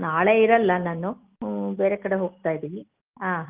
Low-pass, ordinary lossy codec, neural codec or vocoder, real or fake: 3.6 kHz; Opus, 24 kbps; none; real